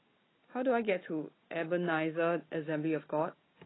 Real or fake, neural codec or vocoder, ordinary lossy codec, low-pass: real; none; AAC, 16 kbps; 7.2 kHz